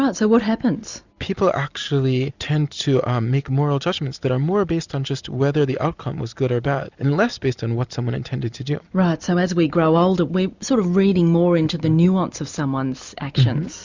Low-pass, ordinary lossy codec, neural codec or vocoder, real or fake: 7.2 kHz; Opus, 64 kbps; none; real